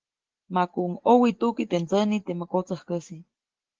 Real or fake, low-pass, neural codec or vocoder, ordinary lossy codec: real; 7.2 kHz; none; Opus, 16 kbps